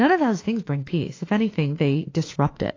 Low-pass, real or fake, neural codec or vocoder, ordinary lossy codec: 7.2 kHz; fake; autoencoder, 48 kHz, 32 numbers a frame, DAC-VAE, trained on Japanese speech; AAC, 32 kbps